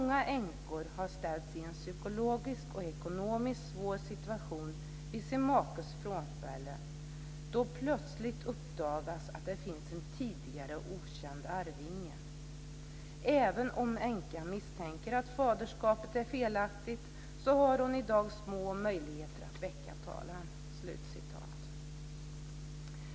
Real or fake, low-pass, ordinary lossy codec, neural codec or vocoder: real; none; none; none